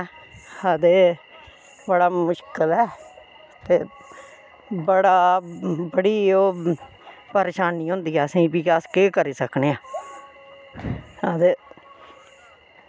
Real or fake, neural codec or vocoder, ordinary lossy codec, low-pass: real; none; none; none